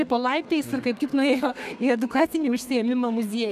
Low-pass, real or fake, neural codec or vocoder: 14.4 kHz; fake; codec, 32 kHz, 1.9 kbps, SNAC